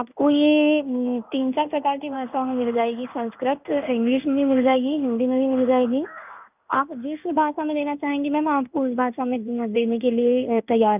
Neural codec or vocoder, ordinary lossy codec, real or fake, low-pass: codec, 16 kHz in and 24 kHz out, 1 kbps, XY-Tokenizer; none; fake; 3.6 kHz